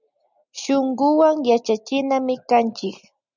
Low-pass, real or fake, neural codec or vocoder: 7.2 kHz; real; none